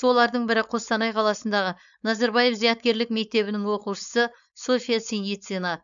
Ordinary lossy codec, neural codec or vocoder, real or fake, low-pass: MP3, 96 kbps; codec, 16 kHz, 4.8 kbps, FACodec; fake; 7.2 kHz